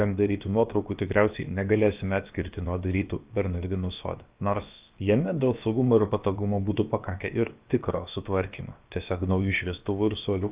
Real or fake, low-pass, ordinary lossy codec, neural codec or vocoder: fake; 3.6 kHz; Opus, 24 kbps; codec, 16 kHz, about 1 kbps, DyCAST, with the encoder's durations